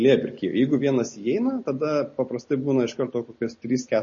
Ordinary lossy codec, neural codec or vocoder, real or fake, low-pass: MP3, 32 kbps; none; real; 7.2 kHz